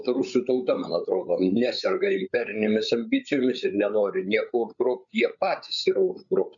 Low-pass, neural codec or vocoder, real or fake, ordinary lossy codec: 7.2 kHz; codec, 16 kHz, 8 kbps, FreqCodec, larger model; fake; MP3, 96 kbps